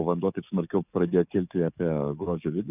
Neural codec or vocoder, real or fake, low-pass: codec, 44.1 kHz, 7.8 kbps, DAC; fake; 3.6 kHz